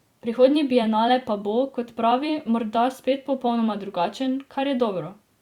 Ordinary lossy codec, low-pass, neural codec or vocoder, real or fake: Opus, 64 kbps; 19.8 kHz; vocoder, 44.1 kHz, 128 mel bands every 256 samples, BigVGAN v2; fake